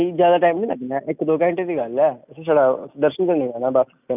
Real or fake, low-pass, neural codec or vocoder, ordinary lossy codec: real; 3.6 kHz; none; none